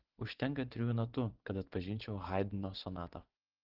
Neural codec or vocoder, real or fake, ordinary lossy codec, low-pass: vocoder, 24 kHz, 100 mel bands, Vocos; fake; Opus, 16 kbps; 5.4 kHz